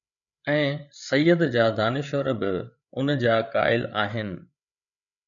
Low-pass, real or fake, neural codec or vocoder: 7.2 kHz; fake; codec, 16 kHz, 8 kbps, FreqCodec, larger model